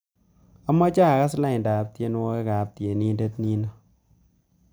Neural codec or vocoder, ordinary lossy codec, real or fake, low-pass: none; none; real; none